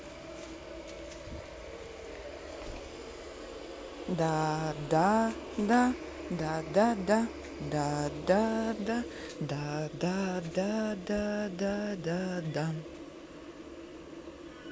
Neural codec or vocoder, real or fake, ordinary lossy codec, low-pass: none; real; none; none